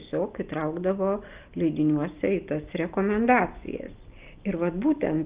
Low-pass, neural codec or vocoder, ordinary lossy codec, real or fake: 3.6 kHz; none; Opus, 32 kbps; real